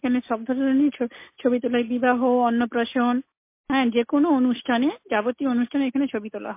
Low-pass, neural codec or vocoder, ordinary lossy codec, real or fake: 3.6 kHz; none; MP3, 24 kbps; real